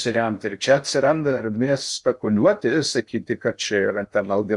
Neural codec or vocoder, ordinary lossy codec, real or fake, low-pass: codec, 16 kHz in and 24 kHz out, 0.6 kbps, FocalCodec, streaming, 2048 codes; Opus, 64 kbps; fake; 10.8 kHz